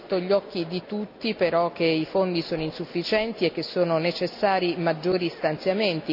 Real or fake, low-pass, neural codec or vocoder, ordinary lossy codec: real; 5.4 kHz; none; none